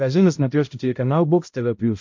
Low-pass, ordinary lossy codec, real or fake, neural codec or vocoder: 7.2 kHz; MP3, 48 kbps; fake; codec, 16 kHz, 0.5 kbps, X-Codec, HuBERT features, trained on balanced general audio